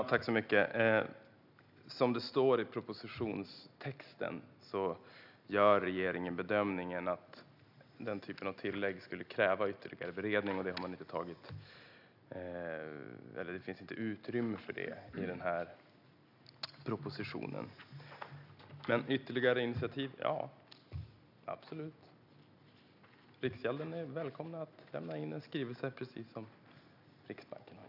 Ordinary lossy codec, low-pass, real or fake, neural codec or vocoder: none; 5.4 kHz; real; none